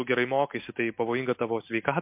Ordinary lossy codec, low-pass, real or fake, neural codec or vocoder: MP3, 32 kbps; 3.6 kHz; real; none